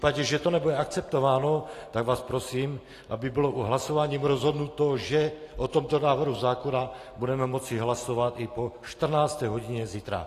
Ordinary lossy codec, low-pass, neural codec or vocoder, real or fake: AAC, 48 kbps; 14.4 kHz; none; real